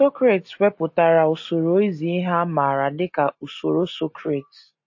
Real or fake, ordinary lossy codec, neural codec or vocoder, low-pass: real; MP3, 32 kbps; none; 7.2 kHz